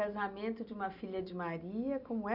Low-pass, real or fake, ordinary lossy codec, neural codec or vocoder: 5.4 kHz; real; none; none